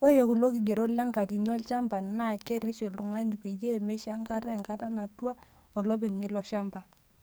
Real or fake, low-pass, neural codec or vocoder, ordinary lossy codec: fake; none; codec, 44.1 kHz, 2.6 kbps, SNAC; none